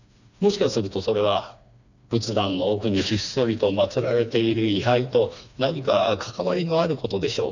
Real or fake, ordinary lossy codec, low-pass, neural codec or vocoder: fake; none; 7.2 kHz; codec, 16 kHz, 2 kbps, FreqCodec, smaller model